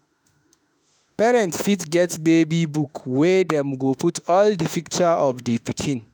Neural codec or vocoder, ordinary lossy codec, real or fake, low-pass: autoencoder, 48 kHz, 32 numbers a frame, DAC-VAE, trained on Japanese speech; none; fake; none